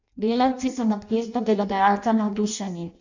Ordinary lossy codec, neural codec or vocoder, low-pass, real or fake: none; codec, 16 kHz in and 24 kHz out, 0.6 kbps, FireRedTTS-2 codec; 7.2 kHz; fake